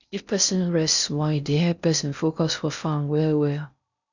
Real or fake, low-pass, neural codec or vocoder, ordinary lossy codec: fake; 7.2 kHz; codec, 16 kHz in and 24 kHz out, 0.6 kbps, FocalCodec, streaming, 4096 codes; none